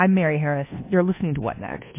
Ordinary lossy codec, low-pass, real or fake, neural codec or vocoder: MP3, 24 kbps; 3.6 kHz; fake; codec, 24 kHz, 1.2 kbps, DualCodec